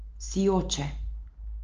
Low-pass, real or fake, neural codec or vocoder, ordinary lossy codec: 7.2 kHz; real; none; Opus, 24 kbps